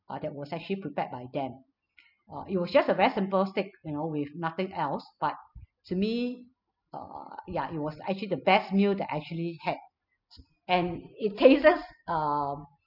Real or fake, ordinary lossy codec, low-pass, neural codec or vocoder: real; none; 5.4 kHz; none